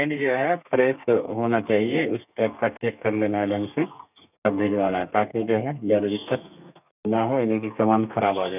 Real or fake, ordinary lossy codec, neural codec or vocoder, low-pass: fake; none; codec, 32 kHz, 1.9 kbps, SNAC; 3.6 kHz